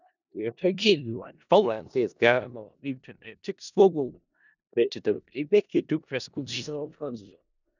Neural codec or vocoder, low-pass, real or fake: codec, 16 kHz in and 24 kHz out, 0.4 kbps, LongCat-Audio-Codec, four codebook decoder; 7.2 kHz; fake